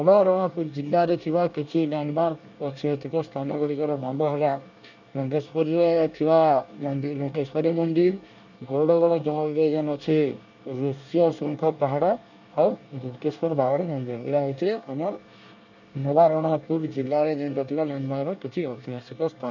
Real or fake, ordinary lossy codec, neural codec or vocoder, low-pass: fake; none; codec, 24 kHz, 1 kbps, SNAC; 7.2 kHz